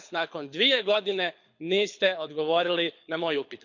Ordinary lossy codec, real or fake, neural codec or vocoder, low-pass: MP3, 64 kbps; fake; codec, 24 kHz, 6 kbps, HILCodec; 7.2 kHz